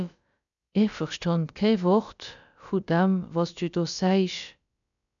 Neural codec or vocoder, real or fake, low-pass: codec, 16 kHz, about 1 kbps, DyCAST, with the encoder's durations; fake; 7.2 kHz